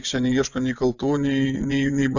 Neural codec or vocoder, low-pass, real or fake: vocoder, 24 kHz, 100 mel bands, Vocos; 7.2 kHz; fake